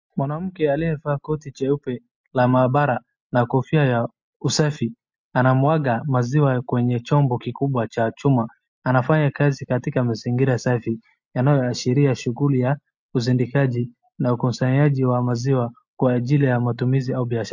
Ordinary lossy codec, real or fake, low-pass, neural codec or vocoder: MP3, 48 kbps; real; 7.2 kHz; none